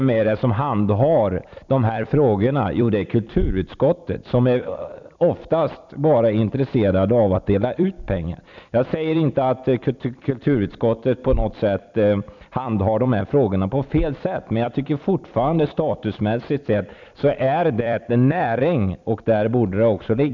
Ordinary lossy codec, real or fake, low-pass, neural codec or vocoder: none; fake; 7.2 kHz; vocoder, 44.1 kHz, 128 mel bands every 256 samples, BigVGAN v2